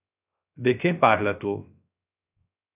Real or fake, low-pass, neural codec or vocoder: fake; 3.6 kHz; codec, 16 kHz, 0.3 kbps, FocalCodec